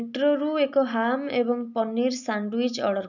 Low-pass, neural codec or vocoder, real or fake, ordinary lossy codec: 7.2 kHz; none; real; none